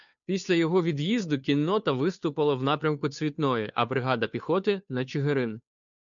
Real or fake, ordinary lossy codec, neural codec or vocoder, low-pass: fake; MP3, 96 kbps; codec, 16 kHz, 2 kbps, FunCodec, trained on Chinese and English, 25 frames a second; 7.2 kHz